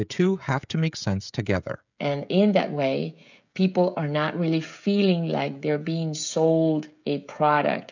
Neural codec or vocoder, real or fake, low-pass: codec, 16 kHz, 16 kbps, FreqCodec, smaller model; fake; 7.2 kHz